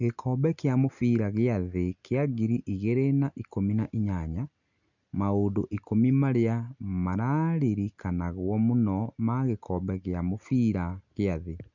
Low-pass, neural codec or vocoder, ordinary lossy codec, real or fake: 7.2 kHz; none; none; real